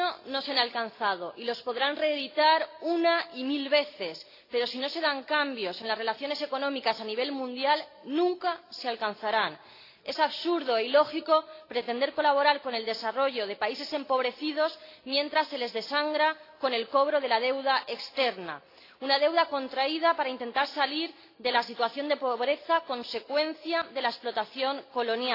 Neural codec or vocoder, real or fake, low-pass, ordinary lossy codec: none; real; 5.4 kHz; AAC, 32 kbps